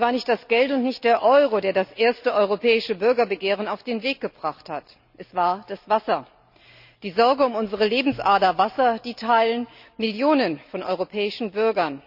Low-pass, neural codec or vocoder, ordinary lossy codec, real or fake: 5.4 kHz; none; none; real